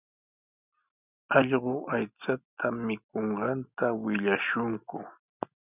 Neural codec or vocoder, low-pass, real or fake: none; 3.6 kHz; real